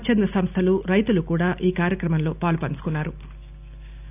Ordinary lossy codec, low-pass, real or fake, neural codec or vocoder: none; 3.6 kHz; real; none